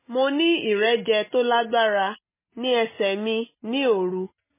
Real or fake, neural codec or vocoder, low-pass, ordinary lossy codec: real; none; 3.6 kHz; MP3, 16 kbps